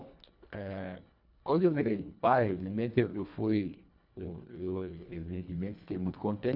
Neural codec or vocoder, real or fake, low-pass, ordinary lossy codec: codec, 24 kHz, 1.5 kbps, HILCodec; fake; 5.4 kHz; none